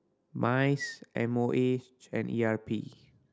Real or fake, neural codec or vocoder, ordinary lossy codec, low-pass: real; none; none; none